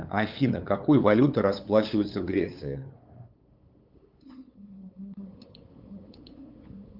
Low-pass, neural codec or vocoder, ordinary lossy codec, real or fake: 5.4 kHz; codec, 16 kHz, 8 kbps, FunCodec, trained on LibriTTS, 25 frames a second; Opus, 24 kbps; fake